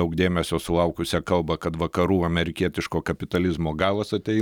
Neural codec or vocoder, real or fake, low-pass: none; real; 19.8 kHz